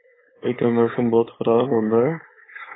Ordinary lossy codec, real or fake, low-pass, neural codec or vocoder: AAC, 16 kbps; fake; 7.2 kHz; codec, 16 kHz, 4.8 kbps, FACodec